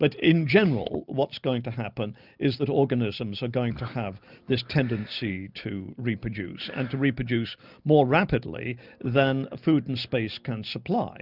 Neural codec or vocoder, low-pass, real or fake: none; 5.4 kHz; real